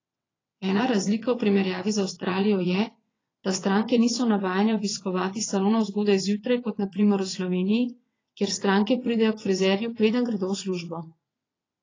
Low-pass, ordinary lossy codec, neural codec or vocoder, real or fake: 7.2 kHz; AAC, 32 kbps; vocoder, 22.05 kHz, 80 mel bands, WaveNeXt; fake